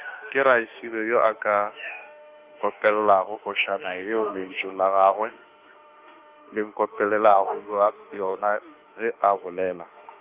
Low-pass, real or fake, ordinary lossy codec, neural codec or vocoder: 3.6 kHz; fake; Opus, 24 kbps; autoencoder, 48 kHz, 32 numbers a frame, DAC-VAE, trained on Japanese speech